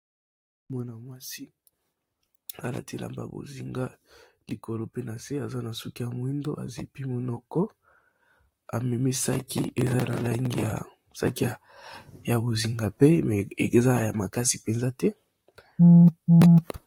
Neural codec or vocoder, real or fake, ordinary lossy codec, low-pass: vocoder, 44.1 kHz, 128 mel bands, Pupu-Vocoder; fake; AAC, 48 kbps; 19.8 kHz